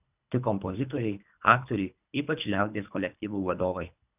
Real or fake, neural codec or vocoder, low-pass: fake; codec, 24 kHz, 3 kbps, HILCodec; 3.6 kHz